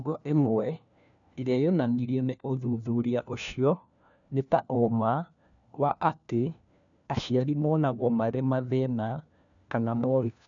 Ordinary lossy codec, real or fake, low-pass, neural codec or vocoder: none; fake; 7.2 kHz; codec, 16 kHz, 1 kbps, FunCodec, trained on LibriTTS, 50 frames a second